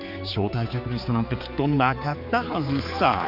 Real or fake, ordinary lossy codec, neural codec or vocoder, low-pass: fake; MP3, 48 kbps; codec, 16 kHz, 4 kbps, X-Codec, HuBERT features, trained on general audio; 5.4 kHz